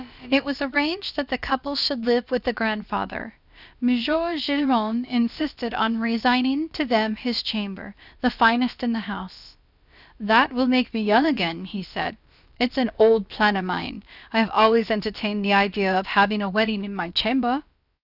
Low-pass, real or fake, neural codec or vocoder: 5.4 kHz; fake; codec, 16 kHz, about 1 kbps, DyCAST, with the encoder's durations